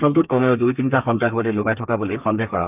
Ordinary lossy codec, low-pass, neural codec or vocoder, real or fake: none; 3.6 kHz; codec, 32 kHz, 1.9 kbps, SNAC; fake